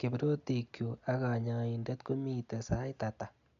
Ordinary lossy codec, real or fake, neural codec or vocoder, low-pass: none; real; none; 7.2 kHz